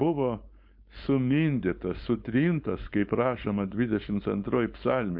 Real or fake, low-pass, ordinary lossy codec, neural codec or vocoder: fake; 5.4 kHz; MP3, 48 kbps; codec, 16 kHz, 4.8 kbps, FACodec